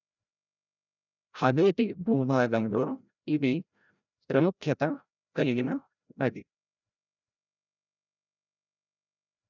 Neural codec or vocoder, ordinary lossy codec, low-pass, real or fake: codec, 16 kHz, 0.5 kbps, FreqCodec, larger model; none; 7.2 kHz; fake